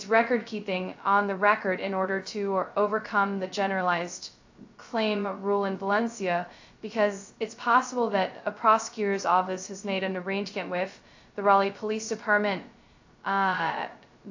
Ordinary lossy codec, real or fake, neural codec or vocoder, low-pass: AAC, 48 kbps; fake; codec, 16 kHz, 0.2 kbps, FocalCodec; 7.2 kHz